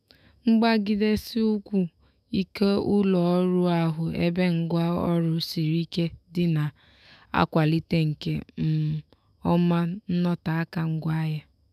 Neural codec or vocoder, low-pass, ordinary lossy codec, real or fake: autoencoder, 48 kHz, 128 numbers a frame, DAC-VAE, trained on Japanese speech; 14.4 kHz; none; fake